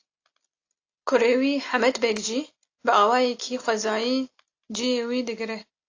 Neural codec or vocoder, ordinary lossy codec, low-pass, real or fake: none; AAC, 48 kbps; 7.2 kHz; real